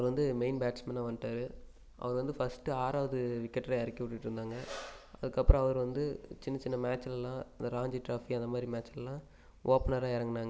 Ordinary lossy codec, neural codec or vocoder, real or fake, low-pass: none; none; real; none